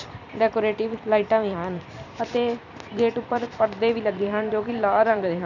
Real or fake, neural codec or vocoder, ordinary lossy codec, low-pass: fake; vocoder, 44.1 kHz, 128 mel bands every 256 samples, BigVGAN v2; none; 7.2 kHz